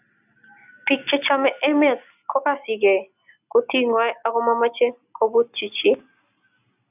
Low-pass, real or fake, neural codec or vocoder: 3.6 kHz; real; none